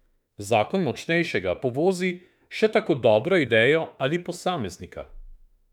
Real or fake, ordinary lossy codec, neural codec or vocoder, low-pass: fake; none; autoencoder, 48 kHz, 32 numbers a frame, DAC-VAE, trained on Japanese speech; 19.8 kHz